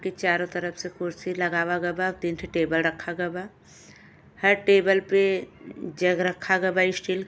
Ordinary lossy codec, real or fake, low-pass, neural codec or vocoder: none; real; none; none